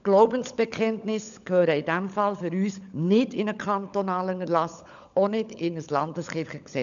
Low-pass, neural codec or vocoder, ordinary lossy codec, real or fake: 7.2 kHz; codec, 16 kHz, 16 kbps, FunCodec, trained on LibriTTS, 50 frames a second; none; fake